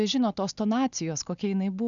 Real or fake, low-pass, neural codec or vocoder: real; 7.2 kHz; none